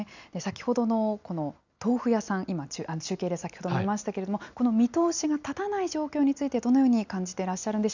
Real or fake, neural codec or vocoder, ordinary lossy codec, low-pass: real; none; none; 7.2 kHz